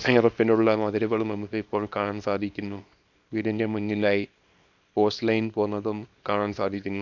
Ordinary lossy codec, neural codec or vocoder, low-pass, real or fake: none; codec, 24 kHz, 0.9 kbps, WavTokenizer, small release; 7.2 kHz; fake